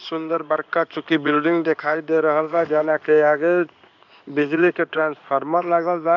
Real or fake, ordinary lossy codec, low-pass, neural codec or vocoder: fake; none; 7.2 kHz; codec, 16 kHz in and 24 kHz out, 1 kbps, XY-Tokenizer